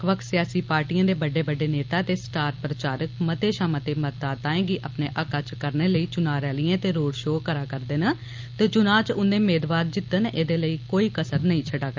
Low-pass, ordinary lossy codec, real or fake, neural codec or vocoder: 7.2 kHz; Opus, 24 kbps; real; none